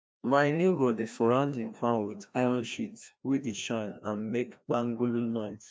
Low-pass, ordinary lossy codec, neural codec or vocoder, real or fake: none; none; codec, 16 kHz, 1 kbps, FreqCodec, larger model; fake